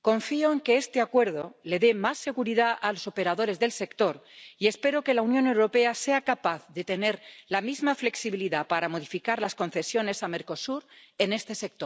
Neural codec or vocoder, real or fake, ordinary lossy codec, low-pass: none; real; none; none